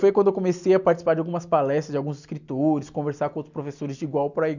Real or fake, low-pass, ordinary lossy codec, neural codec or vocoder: real; 7.2 kHz; none; none